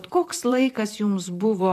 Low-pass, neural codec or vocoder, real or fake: 14.4 kHz; vocoder, 44.1 kHz, 128 mel bands, Pupu-Vocoder; fake